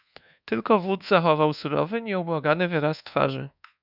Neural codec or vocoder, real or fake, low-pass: codec, 24 kHz, 1.2 kbps, DualCodec; fake; 5.4 kHz